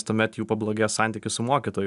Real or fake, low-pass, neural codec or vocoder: real; 10.8 kHz; none